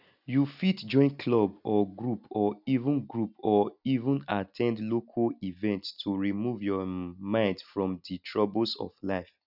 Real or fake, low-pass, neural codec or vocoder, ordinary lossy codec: real; 5.4 kHz; none; none